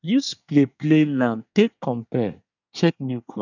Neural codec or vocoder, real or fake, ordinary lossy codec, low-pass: codec, 24 kHz, 1 kbps, SNAC; fake; none; 7.2 kHz